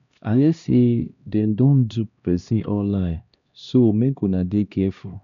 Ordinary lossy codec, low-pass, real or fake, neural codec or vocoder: MP3, 96 kbps; 7.2 kHz; fake; codec, 16 kHz, 1 kbps, X-Codec, HuBERT features, trained on LibriSpeech